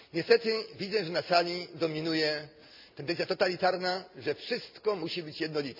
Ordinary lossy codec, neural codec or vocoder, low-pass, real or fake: MP3, 48 kbps; none; 5.4 kHz; real